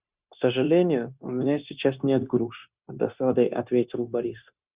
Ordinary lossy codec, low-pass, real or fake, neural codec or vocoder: Opus, 64 kbps; 3.6 kHz; fake; codec, 16 kHz, 0.9 kbps, LongCat-Audio-Codec